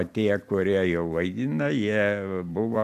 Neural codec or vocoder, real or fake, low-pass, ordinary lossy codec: vocoder, 44.1 kHz, 128 mel bands every 256 samples, BigVGAN v2; fake; 14.4 kHz; MP3, 96 kbps